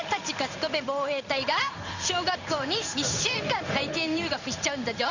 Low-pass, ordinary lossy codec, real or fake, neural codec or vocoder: 7.2 kHz; none; fake; codec, 16 kHz in and 24 kHz out, 1 kbps, XY-Tokenizer